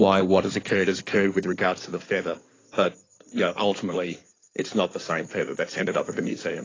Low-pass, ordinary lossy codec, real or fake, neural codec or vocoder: 7.2 kHz; AAC, 32 kbps; fake; codec, 16 kHz in and 24 kHz out, 2.2 kbps, FireRedTTS-2 codec